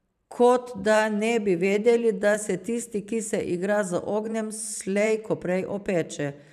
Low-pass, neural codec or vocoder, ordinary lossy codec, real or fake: 14.4 kHz; vocoder, 44.1 kHz, 128 mel bands every 512 samples, BigVGAN v2; none; fake